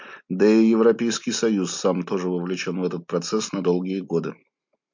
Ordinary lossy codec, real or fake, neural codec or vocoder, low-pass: MP3, 48 kbps; real; none; 7.2 kHz